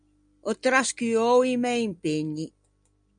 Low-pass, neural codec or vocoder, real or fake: 9.9 kHz; none; real